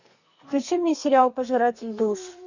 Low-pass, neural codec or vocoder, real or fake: 7.2 kHz; codec, 32 kHz, 1.9 kbps, SNAC; fake